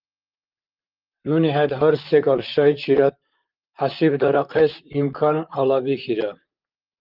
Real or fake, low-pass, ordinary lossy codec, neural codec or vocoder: fake; 5.4 kHz; Opus, 32 kbps; vocoder, 44.1 kHz, 128 mel bands, Pupu-Vocoder